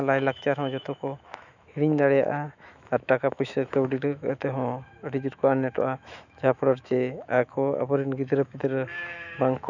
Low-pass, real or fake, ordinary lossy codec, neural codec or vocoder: 7.2 kHz; fake; none; autoencoder, 48 kHz, 128 numbers a frame, DAC-VAE, trained on Japanese speech